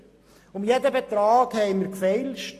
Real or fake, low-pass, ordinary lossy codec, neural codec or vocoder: real; 14.4 kHz; AAC, 48 kbps; none